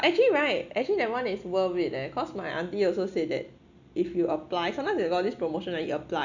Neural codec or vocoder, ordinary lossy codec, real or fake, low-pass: none; none; real; 7.2 kHz